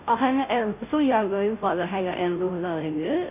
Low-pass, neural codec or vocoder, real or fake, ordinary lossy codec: 3.6 kHz; codec, 16 kHz, 0.5 kbps, FunCodec, trained on Chinese and English, 25 frames a second; fake; none